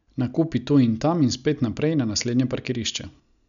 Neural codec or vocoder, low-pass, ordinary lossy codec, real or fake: none; 7.2 kHz; none; real